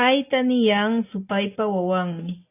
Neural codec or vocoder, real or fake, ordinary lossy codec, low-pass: none; real; AAC, 24 kbps; 3.6 kHz